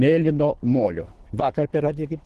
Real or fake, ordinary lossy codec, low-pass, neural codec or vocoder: fake; Opus, 16 kbps; 10.8 kHz; codec, 24 kHz, 3 kbps, HILCodec